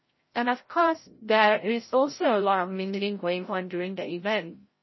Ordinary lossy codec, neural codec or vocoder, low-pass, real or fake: MP3, 24 kbps; codec, 16 kHz, 0.5 kbps, FreqCodec, larger model; 7.2 kHz; fake